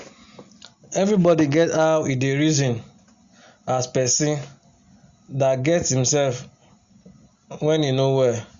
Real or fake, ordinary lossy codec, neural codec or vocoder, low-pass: real; Opus, 64 kbps; none; 7.2 kHz